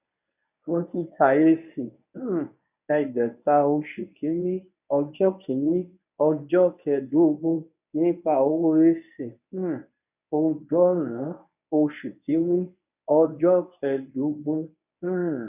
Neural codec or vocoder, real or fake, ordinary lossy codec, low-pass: codec, 24 kHz, 0.9 kbps, WavTokenizer, medium speech release version 1; fake; none; 3.6 kHz